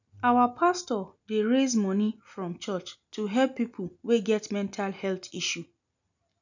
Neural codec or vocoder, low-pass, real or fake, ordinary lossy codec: none; 7.2 kHz; real; none